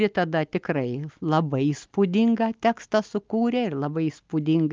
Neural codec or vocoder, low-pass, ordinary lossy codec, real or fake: none; 7.2 kHz; Opus, 24 kbps; real